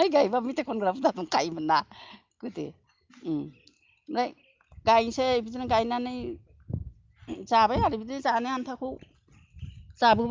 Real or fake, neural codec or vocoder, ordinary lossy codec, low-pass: real; none; Opus, 24 kbps; 7.2 kHz